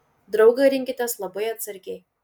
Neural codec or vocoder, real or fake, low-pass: none; real; 19.8 kHz